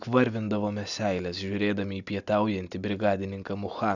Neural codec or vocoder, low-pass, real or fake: none; 7.2 kHz; real